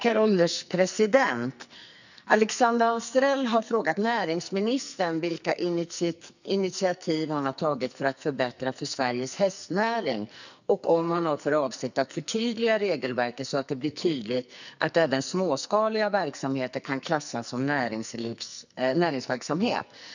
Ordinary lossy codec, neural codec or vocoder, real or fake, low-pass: none; codec, 44.1 kHz, 2.6 kbps, SNAC; fake; 7.2 kHz